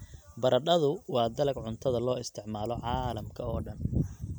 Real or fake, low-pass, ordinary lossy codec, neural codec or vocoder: real; none; none; none